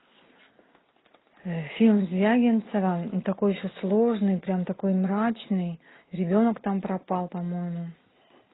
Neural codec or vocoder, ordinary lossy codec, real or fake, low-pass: none; AAC, 16 kbps; real; 7.2 kHz